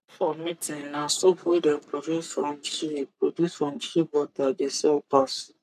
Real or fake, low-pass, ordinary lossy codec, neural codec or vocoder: fake; 14.4 kHz; AAC, 96 kbps; codec, 44.1 kHz, 3.4 kbps, Pupu-Codec